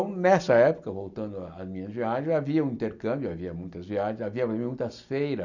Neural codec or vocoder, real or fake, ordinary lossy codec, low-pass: none; real; none; 7.2 kHz